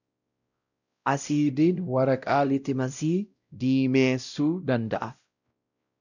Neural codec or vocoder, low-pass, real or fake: codec, 16 kHz, 0.5 kbps, X-Codec, WavLM features, trained on Multilingual LibriSpeech; 7.2 kHz; fake